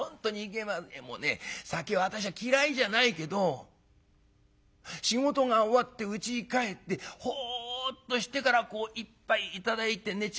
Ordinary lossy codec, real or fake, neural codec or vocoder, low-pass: none; real; none; none